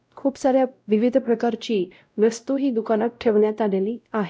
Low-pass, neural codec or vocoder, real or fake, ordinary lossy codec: none; codec, 16 kHz, 0.5 kbps, X-Codec, WavLM features, trained on Multilingual LibriSpeech; fake; none